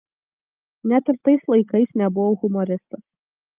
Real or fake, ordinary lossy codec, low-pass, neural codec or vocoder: real; Opus, 32 kbps; 3.6 kHz; none